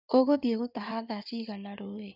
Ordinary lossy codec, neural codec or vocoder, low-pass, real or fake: none; codec, 16 kHz in and 24 kHz out, 2.2 kbps, FireRedTTS-2 codec; 5.4 kHz; fake